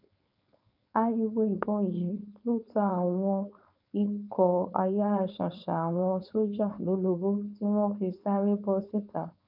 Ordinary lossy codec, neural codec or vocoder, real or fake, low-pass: none; codec, 16 kHz, 4.8 kbps, FACodec; fake; 5.4 kHz